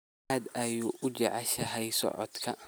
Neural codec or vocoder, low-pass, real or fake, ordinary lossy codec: none; none; real; none